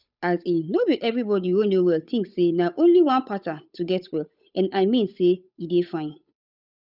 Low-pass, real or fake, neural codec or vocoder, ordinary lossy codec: 5.4 kHz; fake; codec, 16 kHz, 8 kbps, FunCodec, trained on Chinese and English, 25 frames a second; none